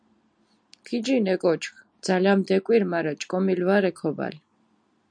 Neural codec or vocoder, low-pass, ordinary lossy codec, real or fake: none; 9.9 kHz; MP3, 96 kbps; real